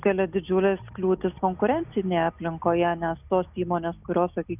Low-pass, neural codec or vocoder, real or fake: 3.6 kHz; none; real